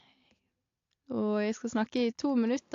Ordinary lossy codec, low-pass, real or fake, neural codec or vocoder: AAC, 48 kbps; 7.2 kHz; real; none